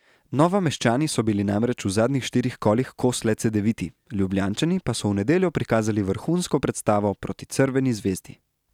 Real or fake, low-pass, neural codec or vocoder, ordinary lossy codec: real; 19.8 kHz; none; none